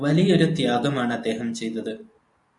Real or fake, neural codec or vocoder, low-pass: real; none; 10.8 kHz